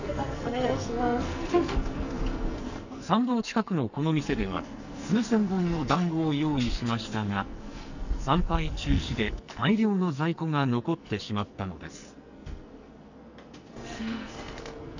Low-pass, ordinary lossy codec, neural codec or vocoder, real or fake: 7.2 kHz; none; codec, 44.1 kHz, 2.6 kbps, SNAC; fake